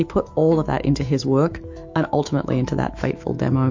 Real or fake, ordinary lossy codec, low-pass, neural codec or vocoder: fake; MP3, 48 kbps; 7.2 kHz; autoencoder, 48 kHz, 128 numbers a frame, DAC-VAE, trained on Japanese speech